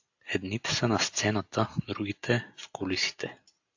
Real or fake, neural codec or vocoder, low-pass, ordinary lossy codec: real; none; 7.2 kHz; AAC, 48 kbps